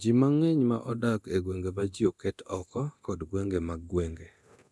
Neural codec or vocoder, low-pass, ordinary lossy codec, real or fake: codec, 24 kHz, 0.9 kbps, DualCodec; none; none; fake